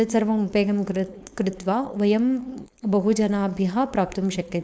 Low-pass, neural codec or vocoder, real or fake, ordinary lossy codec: none; codec, 16 kHz, 4.8 kbps, FACodec; fake; none